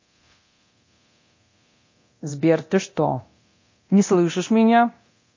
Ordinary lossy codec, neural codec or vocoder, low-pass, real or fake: MP3, 32 kbps; codec, 24 kHz, 0.9 kbps, DualCodec; 7.2 kHz; fake